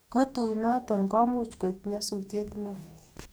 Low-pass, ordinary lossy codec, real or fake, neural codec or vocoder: none; none; fake; codec, 44.1 kHz, 2.6 kbps, DAC